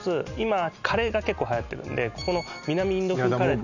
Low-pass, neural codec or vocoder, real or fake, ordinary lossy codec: 7.2 kHz; none; real; none